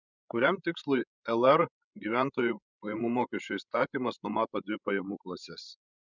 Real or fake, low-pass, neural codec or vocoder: fake; 7.2 kHz; codec, 16 kHz, 16 kbps, FreqCodec, larger model